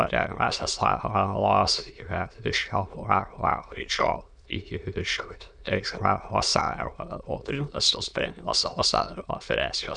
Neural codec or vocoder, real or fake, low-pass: autoencoder, 22.05 kHz, a latent of 192 numbers a frame, VITS, trained on many speakers; fake; 9.9 kHz